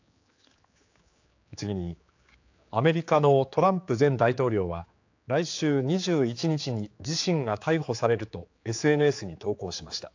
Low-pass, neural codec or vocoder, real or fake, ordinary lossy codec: 7.2 kHz; codec, 16 kHz, 4 kbps, X-Codec, HuBERT features, trained on general audio; fake; AAC, 48 kbps